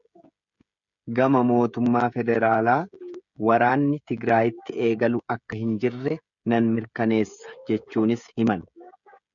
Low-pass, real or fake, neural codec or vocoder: 7.2 kHz; fake; codec, 16 kHz, 16 kbps, FreqCodec, smaller model